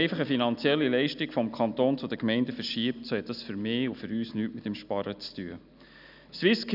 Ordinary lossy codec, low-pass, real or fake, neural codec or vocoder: none; 5.4 kHz; real; none